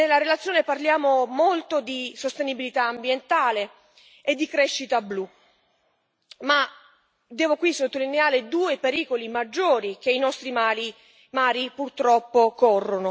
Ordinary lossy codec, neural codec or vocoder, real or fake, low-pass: none; none; real; none